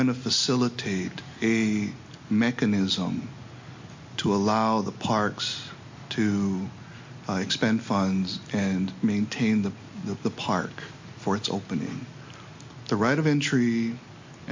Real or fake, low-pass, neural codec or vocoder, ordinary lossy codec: real; 7.2 kHz; none; MP3, 48 kbps